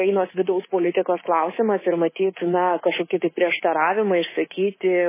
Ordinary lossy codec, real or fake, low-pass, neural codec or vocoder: MP3, 16 kbps; fake; 3.6 kHz; codec, 24 kHz, 3.1 kbps, DualCodec